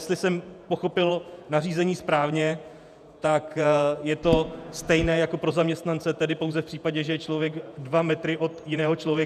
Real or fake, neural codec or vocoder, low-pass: fake; vocoder, 48 kHz, 128 mel bands, Vocos; 14.4 kHz